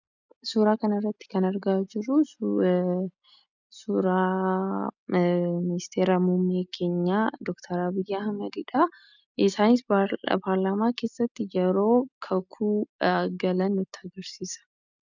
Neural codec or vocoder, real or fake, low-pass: none; real; 7.2 kHz